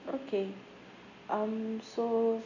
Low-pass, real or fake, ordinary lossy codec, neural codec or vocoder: 7.2 kHz; real; none; none